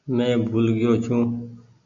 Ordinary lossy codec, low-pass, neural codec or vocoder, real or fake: MP3, 48 kbps; 7.2 kHz; none; real